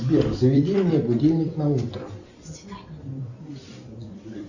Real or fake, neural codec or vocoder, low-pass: fake; vocoder, 44.1 kHz, 128 mel bands every 256 samples, BigVGAN v2; 7.2 kHz